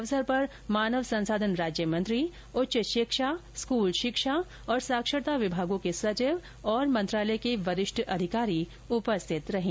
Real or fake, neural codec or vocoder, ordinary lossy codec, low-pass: real; none; none; none